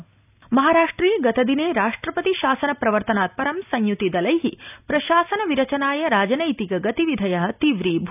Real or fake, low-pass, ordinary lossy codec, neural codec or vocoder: real; 3.6 kHz; none; none